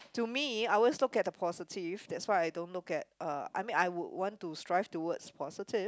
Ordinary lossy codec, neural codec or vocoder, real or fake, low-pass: none; none; real; none